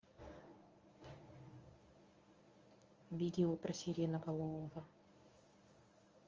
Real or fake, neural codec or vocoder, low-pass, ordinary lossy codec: fake; codec, 24 kHz, 0.9 kbps, WavTokenizer, medium speech release version 1; 7.2 kHz; Opus, 32 kbps